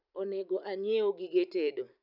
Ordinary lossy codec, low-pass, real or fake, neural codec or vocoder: none; 5.4 kHz; fake; vocoder, 44.1 kHz, 128 mel bands every 512 samples, BigVGAN v2